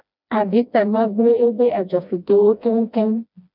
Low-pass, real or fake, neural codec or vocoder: 5.4 kHz; fake; codec, 16 kHz, 1 kbps, FreqCodec, smaller model